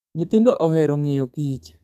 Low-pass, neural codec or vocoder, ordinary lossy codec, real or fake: 14.4 kHz; codec, 32 kHz, 1.9 kbps, SNAC; none; fake